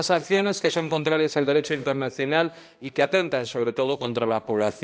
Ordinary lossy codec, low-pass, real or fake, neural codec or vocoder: none; none; fake; codec, 16 kHz, 1 kbps, X-Codec, HuBERT features, trained on balanced general audio